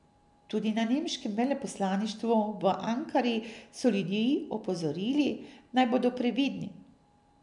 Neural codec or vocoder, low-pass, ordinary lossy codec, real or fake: none; 10.8 kHz; none; real